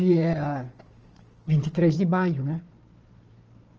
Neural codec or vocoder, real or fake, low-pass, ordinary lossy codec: codec, 16 kHz, 4 kbps, FunCodec, trained on Chinese and English, 50 frames a second; fake; 7.2 kHz; Opus, 24 kbps